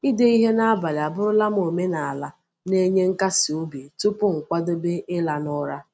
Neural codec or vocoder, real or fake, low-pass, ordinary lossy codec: none; real; none; none